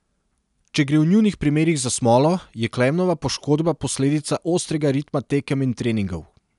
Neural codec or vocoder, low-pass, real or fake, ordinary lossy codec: none; 10.8 kHz; real; none